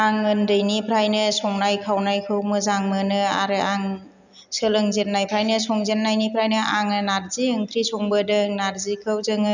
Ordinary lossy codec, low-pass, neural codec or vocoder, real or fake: none; 7.2 kHz; none; real